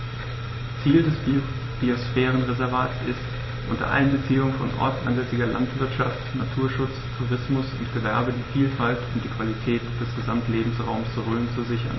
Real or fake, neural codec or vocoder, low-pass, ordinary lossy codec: real; none; 7.2 kHz; MP3, 24 kbps